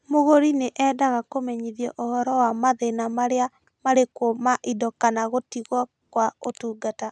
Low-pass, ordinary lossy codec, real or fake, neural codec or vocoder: none; none; real; none